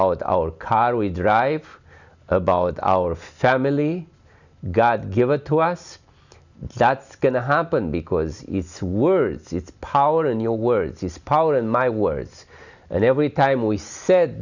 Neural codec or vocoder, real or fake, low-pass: none; real; 7.2 kHz